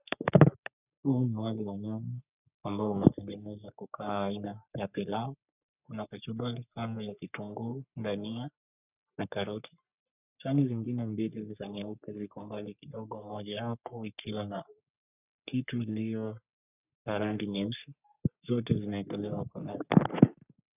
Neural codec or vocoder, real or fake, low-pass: codec, 44.1 kHz, 3.4 kbps, Pupu-Codec; fake; 3.6 kHz